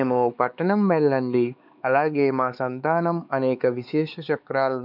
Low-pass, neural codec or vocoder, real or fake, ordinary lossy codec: 5.4 kHz; codec, 16 kHz, 4 kbps, X-Codec, HuBERT features, trained on LibriSpeech; fake; none